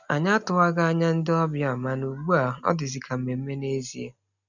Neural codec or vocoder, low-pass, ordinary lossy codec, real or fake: none; 7.2 kHz; none; real